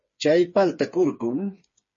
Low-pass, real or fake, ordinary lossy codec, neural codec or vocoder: 7.2 kHz; fake; MP3, 32 kbps; codec, 16 kHz, 4 kbps, FreqCodec, smaller model